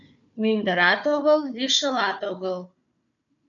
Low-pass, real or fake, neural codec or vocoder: 7.2 kHz; fake; codec, 16 kHz, 4 kbps, FunCodec, trained on Chinese and English, 50 frames a second